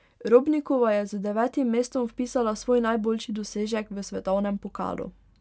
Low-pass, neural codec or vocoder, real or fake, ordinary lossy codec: none; none; real; none